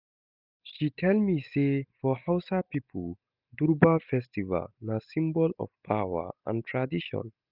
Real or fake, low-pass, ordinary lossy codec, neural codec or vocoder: real; 5.4 kHz; none; none